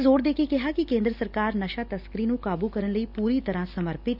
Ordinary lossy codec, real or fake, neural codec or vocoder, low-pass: none; real; none; 5.4 kHz